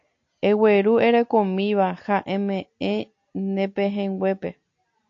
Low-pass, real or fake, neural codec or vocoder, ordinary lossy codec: 7.2 kHz; real; none; AAC, 48 kbps